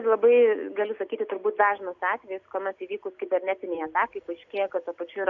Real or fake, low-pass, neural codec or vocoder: real; 7.2 kHz; none